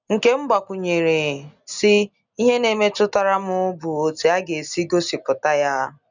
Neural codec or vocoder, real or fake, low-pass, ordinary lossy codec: none; real; 7.2 kHz; none